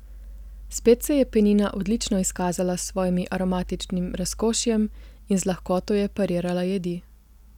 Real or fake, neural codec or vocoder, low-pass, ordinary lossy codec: real; none; 19.8 kHz; none